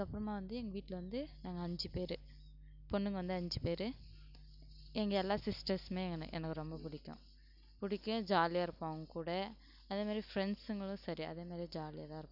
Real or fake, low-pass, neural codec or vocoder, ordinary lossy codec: real; 5.4 kHz; none; none